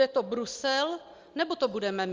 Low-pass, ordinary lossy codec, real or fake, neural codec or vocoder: 7.2 kHz; Opus, 32 kbps; real; none